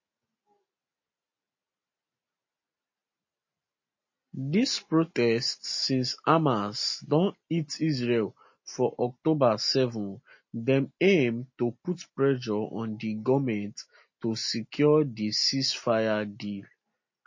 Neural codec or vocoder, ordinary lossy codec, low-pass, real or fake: none; MP3, 32 kbps; 7.2 kHz; real